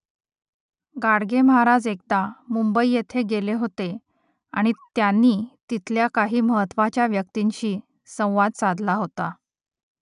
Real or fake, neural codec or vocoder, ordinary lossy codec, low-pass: real; none; none; 10.8 kHz